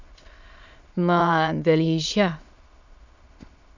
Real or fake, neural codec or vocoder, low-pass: fake; autoencoder, 22.05 kHz, a latent of 192 numbers a frame, VITS, trained on many speakers; 7.2 kHz